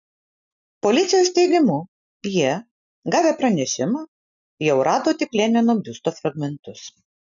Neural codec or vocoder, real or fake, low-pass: none; real; 7.2 kHz